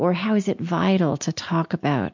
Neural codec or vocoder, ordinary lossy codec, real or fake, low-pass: none; MP3, 48 kbps; real; 7.2 kHz